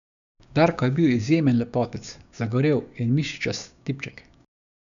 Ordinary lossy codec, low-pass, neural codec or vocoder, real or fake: none; 7.2 kHz; codec, 16 kHz, 6 kbps, DAC; fake